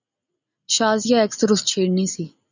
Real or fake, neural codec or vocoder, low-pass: real; none; 7.2 kHz